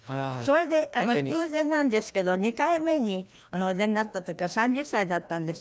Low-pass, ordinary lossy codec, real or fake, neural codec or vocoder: none; none; fake; codec, 16 kHz, 1 kbps, FreqCodec, larger model